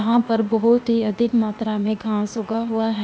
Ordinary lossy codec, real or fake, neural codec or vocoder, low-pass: none; fake; codec, 16 kHz, 0.8 kbps, ZipCodec; none